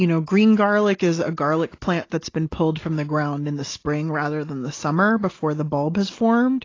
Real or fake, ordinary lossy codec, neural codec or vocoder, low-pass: real; AAC, 32 kbps; none; 7.2 kHz